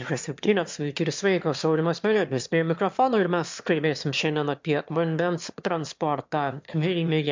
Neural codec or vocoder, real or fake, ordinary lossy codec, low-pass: autoencoder, 22.05 kHz, a latent of 192 numbers a frame, VITS, trained on one speaker; fake; MP3, 64 kbps; 7.2 kHz